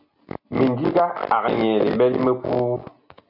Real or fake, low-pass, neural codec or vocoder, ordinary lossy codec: real; 5.4 kHz; none; MP3, 48 kbps